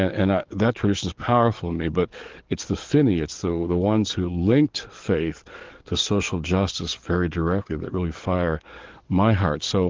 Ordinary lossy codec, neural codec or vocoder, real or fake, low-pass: Opus, 16 kbps; codec, 44.1 kHz, 7.8 kbps, DAC; fake; 7.2 kHz